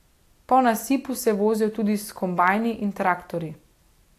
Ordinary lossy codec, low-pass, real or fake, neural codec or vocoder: AAC, 64 kbps; 14.4 kHz; real; none